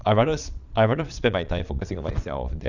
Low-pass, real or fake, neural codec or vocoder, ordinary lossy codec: 7.2 kHz; fake; vocoder, 22.05 kHz, 80 mel bands, Vocos; none